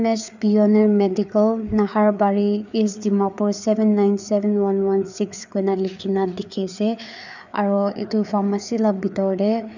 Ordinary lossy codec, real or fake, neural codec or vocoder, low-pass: none; fake; codec, 16 kHz, 4 kbps, FreqCodec, larger model; 7.2 kHz